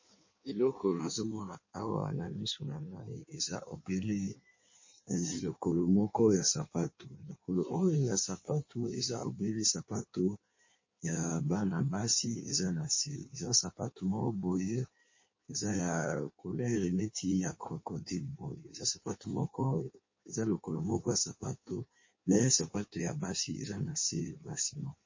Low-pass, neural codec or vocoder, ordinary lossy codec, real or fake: 7.2 kHz; codec, 16 kHz in and 24 kHz out, 1.1 kbps, FireRedTTS-2 codec; MP3, 32 kbps; fake